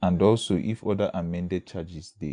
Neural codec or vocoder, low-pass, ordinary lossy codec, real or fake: none; 9.9 kHz; none; real